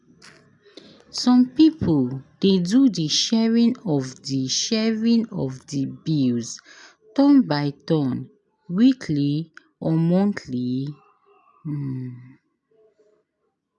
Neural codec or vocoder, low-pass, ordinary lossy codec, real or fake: none; 10.8 kHz; none; real